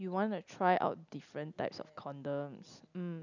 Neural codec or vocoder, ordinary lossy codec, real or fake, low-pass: none; none; real; 7.2 kHz